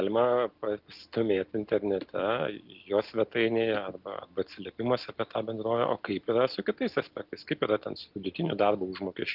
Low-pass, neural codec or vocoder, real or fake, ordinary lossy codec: 5.4 kHz; none; real; Opus, 32 kbps